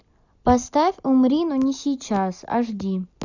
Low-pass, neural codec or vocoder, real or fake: 7.2 kHz; none; real